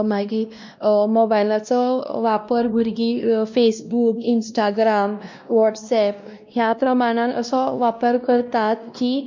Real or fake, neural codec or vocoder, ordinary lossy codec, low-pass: fake; codec, 16 kHz, 1 kbps, X-Codec, WavLM features, trained on Multilingual LibriSpeech; MP3, 64 kbps; 7.2 kHz